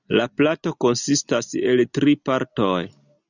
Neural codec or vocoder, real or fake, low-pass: none; real; 7.2 kHz